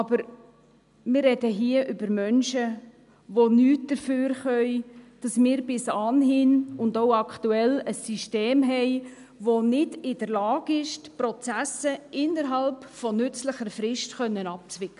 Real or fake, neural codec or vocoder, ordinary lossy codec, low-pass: real; none; none; 10.8 kHz